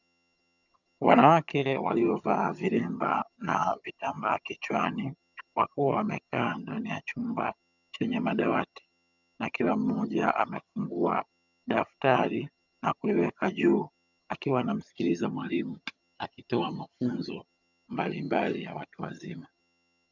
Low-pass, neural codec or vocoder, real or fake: 7.2 kHz; vocoder, 22.05 kHz, 80 mel bands, HiFi-GAN; fake